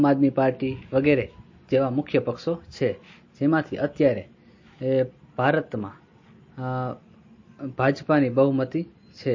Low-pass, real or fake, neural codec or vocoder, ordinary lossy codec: 7.2 kHz; real; none; MP3, 32 kbps